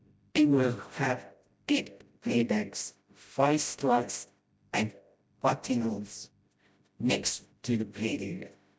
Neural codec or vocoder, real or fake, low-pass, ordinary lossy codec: codec, 16 kHz, 0.5 kbps, FreqCodec, smaller model; fake; none; none